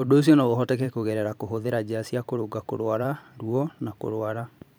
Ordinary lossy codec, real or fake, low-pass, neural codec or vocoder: none; fake; none; vocoder, 44.1 kHz, 128 mel bands every 512 samples, BigVGAN v2